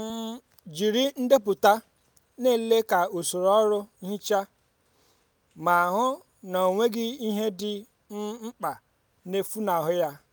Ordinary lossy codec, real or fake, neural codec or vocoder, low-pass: none; real; none; none